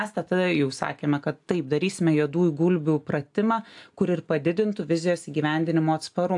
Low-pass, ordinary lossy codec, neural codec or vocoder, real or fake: 10.8 kHz; AAC, 64 kbps; none; real